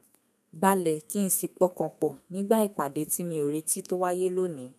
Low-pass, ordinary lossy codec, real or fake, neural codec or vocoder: 14.4 kHz; none; fake; codec, 32 kHz, 1.9 kbps, SNAC